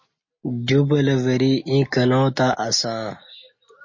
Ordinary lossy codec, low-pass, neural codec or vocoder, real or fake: MP3, 32 kbps; 7.2 kHz; none; real